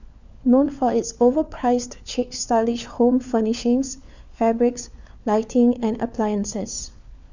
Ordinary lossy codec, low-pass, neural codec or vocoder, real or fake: none; 7.2 kHz; codec, 16 kHz, 4 kbps, FunCodec, trained on LibriTTS, 50 frames a second; fake